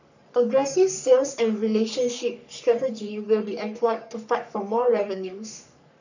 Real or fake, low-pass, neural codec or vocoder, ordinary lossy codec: fake; 7.2 kHz; codec, 44.1 kHz, 3.4 kbps, Pupu-Codec; none